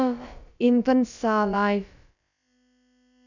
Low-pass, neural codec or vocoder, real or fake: 7.2 kHz; codec, 16 kHz, about 1 kbps, DyCAST, with the encoder's durations; fake